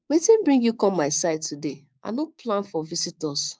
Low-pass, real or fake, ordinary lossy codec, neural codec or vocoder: none; fake; none; codec, 16 kHz, 6 kbps, DAC